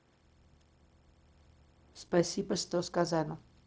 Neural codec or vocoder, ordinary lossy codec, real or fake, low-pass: codec, 16 kHz, 0.4 kbps, LongCat-Audio-Codec; none; fake; none